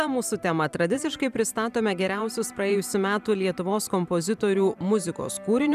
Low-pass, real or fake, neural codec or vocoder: 14.4 kHz; fake; vocoder, 44.1 kHz, 128 mel bands every 512 samples, BigVGAN v2